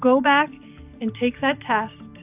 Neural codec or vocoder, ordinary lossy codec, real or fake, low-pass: none; AAC, 32 kbps; real; 3.6 kHz